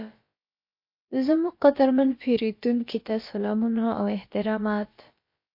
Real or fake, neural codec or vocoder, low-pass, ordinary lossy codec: fake; codec, 16 kHz, about 1 kbps, DyCAST, with the encoder's durations; 5.4 kHz; MP3, 32 kbps